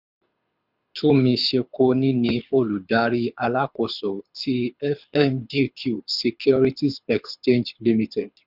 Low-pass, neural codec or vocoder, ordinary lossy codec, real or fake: 5.4 kHz; codec, 24 kHz, 6 kbps, HILCodec; none; fake